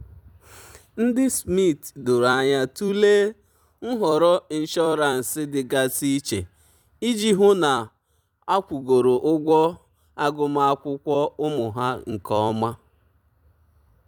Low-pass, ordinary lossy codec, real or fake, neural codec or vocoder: 19.8 kHz; none; fake; vocoder, 44.1 kHz, 128 mel bands every 256 samples, BigVGAN v2